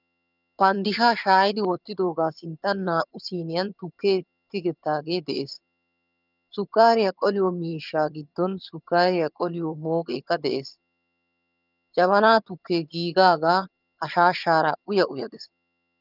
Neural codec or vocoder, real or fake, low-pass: vocoder, 22.05 kHz, 80 mel bands, HiFi-GAN; fake; 5.4 kHz